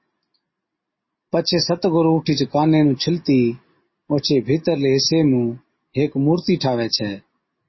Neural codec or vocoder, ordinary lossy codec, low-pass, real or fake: none; MP3, 24 kbps; 7.2 kHz; real